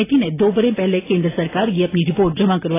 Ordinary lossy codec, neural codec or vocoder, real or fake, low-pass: AAC, 16 kbps; none; real; 3.6 kHz